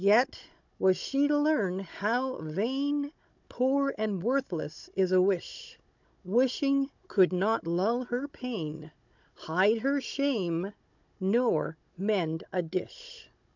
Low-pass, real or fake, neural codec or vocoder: 7.2 kHz; fake; codec, 16 kHz, 16 kbps, FunCodec, trained on Chinese and English, 50 frames a second